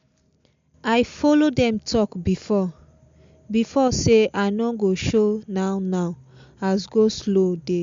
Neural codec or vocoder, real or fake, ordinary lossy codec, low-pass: none; real; MP3, 64 kbps; 7.2 kHz